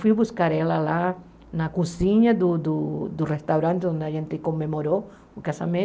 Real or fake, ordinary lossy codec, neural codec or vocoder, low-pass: real; none; none; none